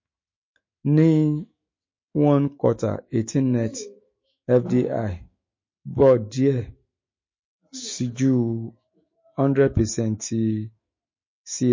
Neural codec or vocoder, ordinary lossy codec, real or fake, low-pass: autoencoder, 48 kHz, 128 numbers a frame, DAC-VAE, trained on Japanese speech; MP3, 32 kbps; fake; 7.2 kHz